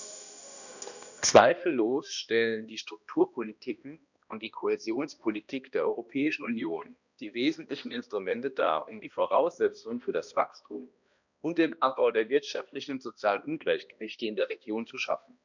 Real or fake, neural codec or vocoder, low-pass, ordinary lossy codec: fake; codec, 16 kHz, 1 kbps, X-Codec, HuBERT features, trained on balanced general audio; 7.2 kHz; none